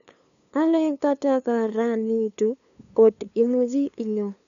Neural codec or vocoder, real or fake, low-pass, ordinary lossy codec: codec, 16 kHz, 2 kbps, FunCodec, trained on LibriTTS, 25 frames a second; fake; 7.2 kHz; none